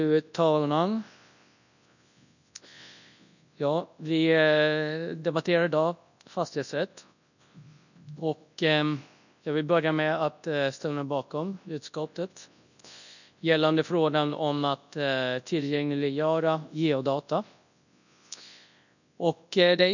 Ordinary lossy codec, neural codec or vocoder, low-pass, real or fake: none; codec, 24 kHz, 0.9 kbps, WavTokenizer, large speech release; 7.2 kHz; fake